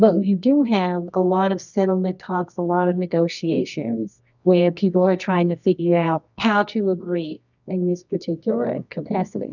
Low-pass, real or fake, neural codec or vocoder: 7.2 kHz; fake; codec, 24 kHz, 0.9 kbps, WavTokenizer, medium music audio release